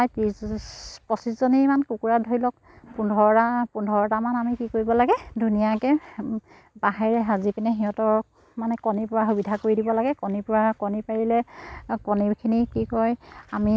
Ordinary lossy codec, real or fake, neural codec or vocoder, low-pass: none; real; none; none